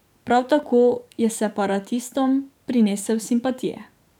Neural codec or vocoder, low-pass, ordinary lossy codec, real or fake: codec, 44.1 kHz, 7.8 kbps, DAC; 19.8 kHz; none; fake